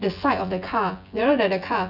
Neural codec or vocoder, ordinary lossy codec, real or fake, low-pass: vocoder, 24 kHz, 100 mel bands, Vocos; none; fake; 5.4 kHz